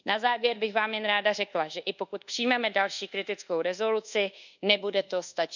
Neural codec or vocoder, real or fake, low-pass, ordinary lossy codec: codec, 24 kHz, 1.2 kbps, DualCodec; fake; 7.2 kHz; none